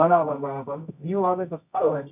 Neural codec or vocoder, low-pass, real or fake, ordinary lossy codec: codec, 24 kHz, 0.9 kbps, WavTokenizer, medium music audio release; 3.6 kHz; fake; none